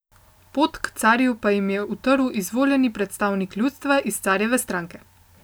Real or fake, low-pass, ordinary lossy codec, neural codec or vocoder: real; none; none; none